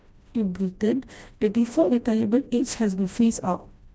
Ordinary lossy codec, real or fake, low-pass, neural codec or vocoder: none; fake; none; codec, 16 kHz, 1 kbps, FreqCodec, smaller model